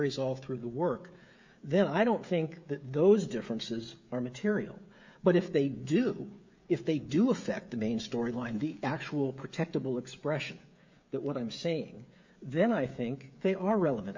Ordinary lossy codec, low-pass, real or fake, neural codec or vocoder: MP3, 64 kbps; 7.2 kHz; fake; codec, 16 kHz, 16 kbps, FreqCodec, smaller model